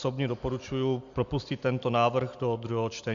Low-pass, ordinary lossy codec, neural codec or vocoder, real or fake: 7.2 kHz; AAC, 64 kbps; none; real